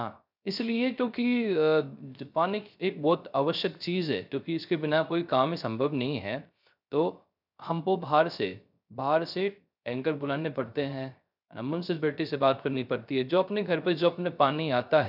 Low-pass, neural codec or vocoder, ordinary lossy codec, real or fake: 5.4 kHz; codec, 16 kHz, 0.3 kbps, FocalCodec; none; fake